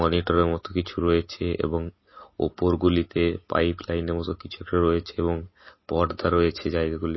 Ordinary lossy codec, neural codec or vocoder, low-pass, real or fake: MP3, 24 kbps; none; 7.2 kHz; real